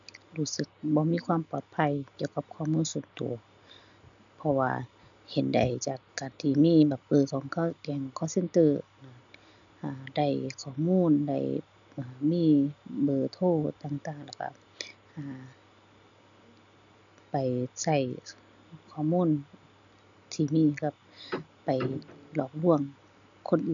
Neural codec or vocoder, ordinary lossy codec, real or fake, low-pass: none; none; real; 7.2 kHz